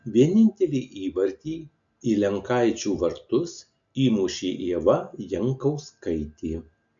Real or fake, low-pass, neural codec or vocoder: real; 7.2 kHz; none